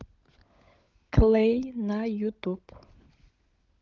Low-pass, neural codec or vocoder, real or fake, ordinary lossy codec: 7.2 kHz; codec, 16 kHz, 16 kbps, FunCodec, trained on LibriTTS, 50 frames a second; fake; Opus, 32 kbps